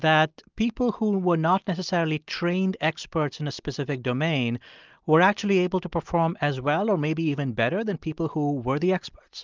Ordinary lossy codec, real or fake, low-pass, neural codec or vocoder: Opus, 32 kbps; real; 7.2 kHz; none